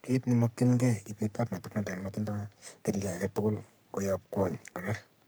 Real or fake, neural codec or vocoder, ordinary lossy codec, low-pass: fake; codec, 44.1 kHz, 3.4 kbps, Pupu-Codec; none; none